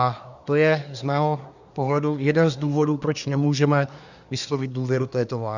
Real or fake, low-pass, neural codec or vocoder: fake; 7.2 kHz; codec, 24 kHz, 1 kbps, SNAC